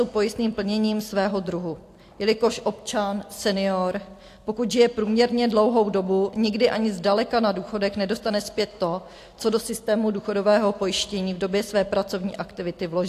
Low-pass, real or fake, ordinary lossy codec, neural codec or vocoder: 14.4 kHz; real; AAC, 64 kbps; none